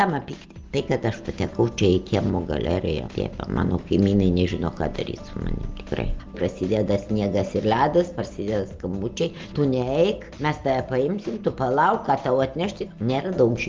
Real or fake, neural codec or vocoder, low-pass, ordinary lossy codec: real; none; 7.2 kHz; Opus, 24 kbps